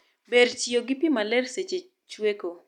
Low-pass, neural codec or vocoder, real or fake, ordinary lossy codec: 19.8 kHz; none; real; none